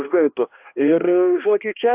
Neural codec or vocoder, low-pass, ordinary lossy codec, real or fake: codec, 16 kHz, 1 kbps, X-Codec, HuBERT features, trained on balanced general audio; 3.6 kHz; AAC, 32 kbps; fake